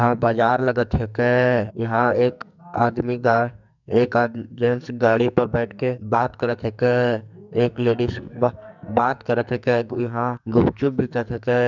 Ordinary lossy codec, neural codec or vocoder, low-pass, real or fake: none; codec, 44.1 kHz, 2.6 kbps, SNAC; 7.2 kHz; fake